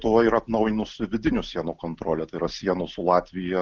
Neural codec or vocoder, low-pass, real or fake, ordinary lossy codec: none; 7.2 kHz; real; Opus, 32 kbps